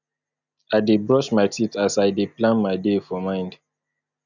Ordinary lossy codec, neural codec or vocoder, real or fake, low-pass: none; none; real; 7.2 kHz